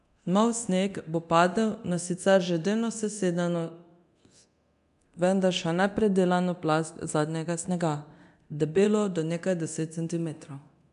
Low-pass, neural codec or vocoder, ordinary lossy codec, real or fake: 10.8 kHz; codec, 24 kHz, 0.9 kbps, DualCodec; none; fake